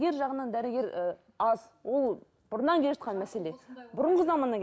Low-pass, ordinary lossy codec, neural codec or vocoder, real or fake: none; none; none; real